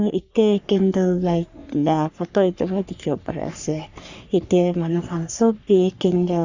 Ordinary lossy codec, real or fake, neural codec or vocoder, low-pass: Opus, 64 kbps; fake; codec, 44.1 kHz, 3.4 kbps, Pupu-Codec; 7.2 kHz